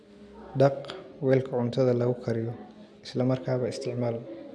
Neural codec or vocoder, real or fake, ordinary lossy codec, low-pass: none; real; none; none